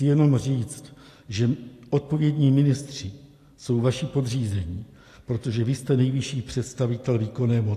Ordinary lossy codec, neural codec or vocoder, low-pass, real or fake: AAC, 64 kbps; none; 14.4 kHz; real